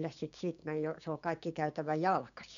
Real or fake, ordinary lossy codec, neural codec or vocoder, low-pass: fake; none; codec, 16 kHz, 6 kbps, DAC; 7.2 kHz